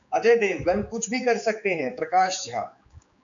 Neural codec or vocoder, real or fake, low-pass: codec, 16 kHz, 4 kbps, X-Codec, HuBERT features, trained on general audio; fake; 7.2 kHz